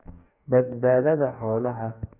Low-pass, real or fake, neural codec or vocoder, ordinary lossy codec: 3.6 kHz; fake; codec, 44.1 kHz, 2.6 kbps, DAC; none